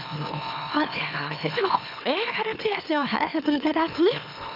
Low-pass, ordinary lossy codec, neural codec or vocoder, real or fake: 5.4 kHz; none; autoencoder, 44.1 kHz, a latent of 192 numbers a frame, MeloTTS; fake